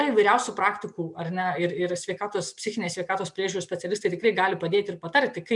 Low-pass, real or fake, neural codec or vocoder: 10.8 kHz; real; none